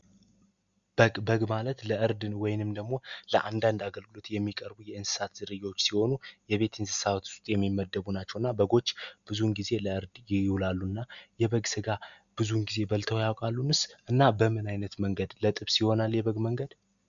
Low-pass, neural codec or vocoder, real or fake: 7.2 kHz; none; real